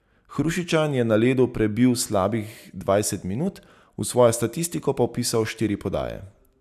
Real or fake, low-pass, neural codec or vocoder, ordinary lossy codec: real; 14.4 kHz; none; none